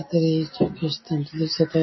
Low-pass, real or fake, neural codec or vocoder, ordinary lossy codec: 7.2 kHz; real; none; MP3, 24 kbps